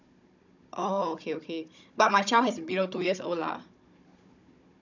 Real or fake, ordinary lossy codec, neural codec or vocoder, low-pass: fake; none; codec, 16 kHz, 16 kbps, FunCodec, trained on Chinese and English, 50 frames a second; 7.2 kHz